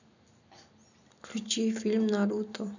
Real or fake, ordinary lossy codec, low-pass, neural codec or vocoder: real; none; 7.2 kHz; none